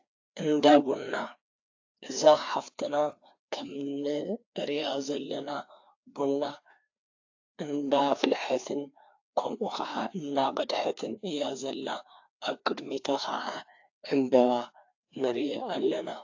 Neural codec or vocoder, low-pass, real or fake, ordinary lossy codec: codec, 16 kHz, 2 kbps, FreqCodec, larger model; 7.2 kHz; fake; AAC, 48 kbps